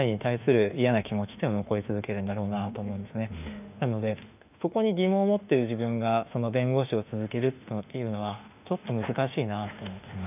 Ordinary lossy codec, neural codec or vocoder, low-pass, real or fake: none; autoencoder, 48 kHz, 32 numbers a frame, DAC-VAE, trained on Japanese speech; 3.6 kHz; fake